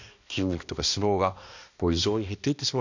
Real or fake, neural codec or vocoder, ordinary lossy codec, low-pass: fake; codec, 16 kHz, 1 kbps, X-Codec, HuBERT features, trained on balanced general audio; none; 7.2 kHz